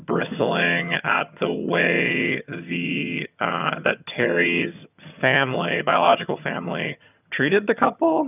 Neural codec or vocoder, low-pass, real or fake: vocoder, 22.05 kHz, 80 mel bands, HiFi-GAN; 3.6 kHz; fake